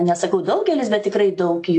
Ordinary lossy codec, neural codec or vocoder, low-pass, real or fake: AAC, 48 kbps; none; 10.8 kHz; real